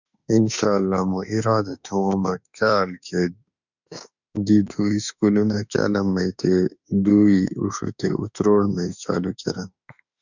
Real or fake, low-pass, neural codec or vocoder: fake; 7.2 kHz; autoencoder, 48 kHz, 32 numbers a frame, DAC-VAE, trained on Japanese speech